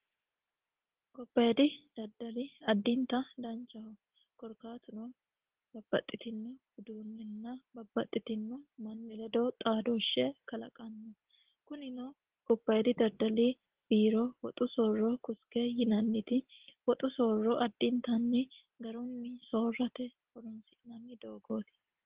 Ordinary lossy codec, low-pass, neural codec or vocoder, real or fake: Opus, 16 kbps; 3.6 kHz; none; real